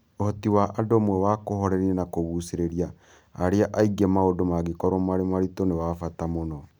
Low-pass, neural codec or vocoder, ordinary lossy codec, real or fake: none; none; none; real